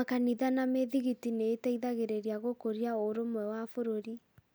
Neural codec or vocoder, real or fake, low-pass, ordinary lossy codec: none; real; none; none